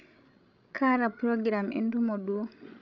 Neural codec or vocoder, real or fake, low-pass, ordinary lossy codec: codec, 16 kHz, 16 kbps, FreqCodec, larger model; fake; 7.2 kHz; none